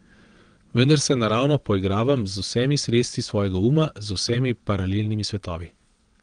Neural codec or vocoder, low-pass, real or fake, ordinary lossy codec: vocoder, 22.05 kHz, 80 mel bands, WaveNeXt; 9.9 kHz; fake; Opus, 24 kbps